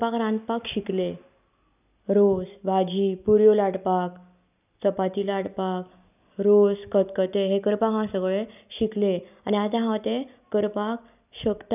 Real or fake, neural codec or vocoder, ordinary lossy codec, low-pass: real; none; none; 3.6 kHz